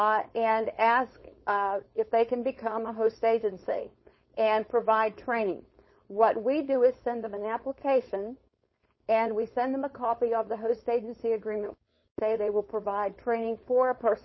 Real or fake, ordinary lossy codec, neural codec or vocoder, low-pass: fake; MP3, 24 kbps; codec, 16 kHz, 4.8 kbps, FACodec; 7.2 kHz